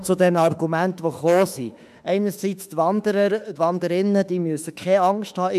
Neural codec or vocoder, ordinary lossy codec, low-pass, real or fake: autoencoder, 48 kHz, 32 numbers a frame, DAC-VAE, trained on Japanese speech; none; 14.4 kHz; fake